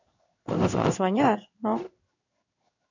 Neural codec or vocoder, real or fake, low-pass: codec, 16 kHz in and 24 kHz out, 1 kbps, XY-Tokenizer; fake; 7.2 kHz